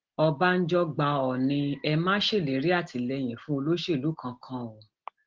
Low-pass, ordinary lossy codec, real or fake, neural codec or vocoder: 7.2 kHz; Opus, 16 kbps; real; none